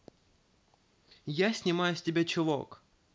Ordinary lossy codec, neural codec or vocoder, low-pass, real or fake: none; none; none; real